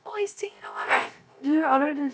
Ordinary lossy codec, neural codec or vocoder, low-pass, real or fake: none; codec, 16 kHz, 0.3 kbps, FocalCodec; none; fake